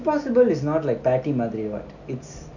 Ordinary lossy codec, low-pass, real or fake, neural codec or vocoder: none; 7.2 kHz; real; none